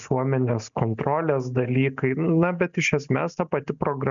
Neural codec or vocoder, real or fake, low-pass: none; real; 7.2 kHz